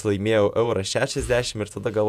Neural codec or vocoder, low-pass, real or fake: vocoder, 48 kHz, 128 mel bands, Vocos; 14.4 kHz; fake